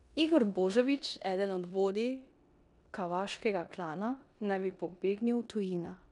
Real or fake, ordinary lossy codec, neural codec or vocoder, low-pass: fake; none; codec, 16 kHz in and 24 kHz out, 0.9 kbps, LongCat-Audio-Codec, four codebook decoder; 10.8 kHz